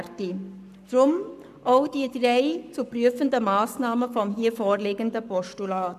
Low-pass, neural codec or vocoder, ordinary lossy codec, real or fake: 14.4 kHz; codec, 44.1 kHz, 7.8 kbps, Pupu-Codec; none; fake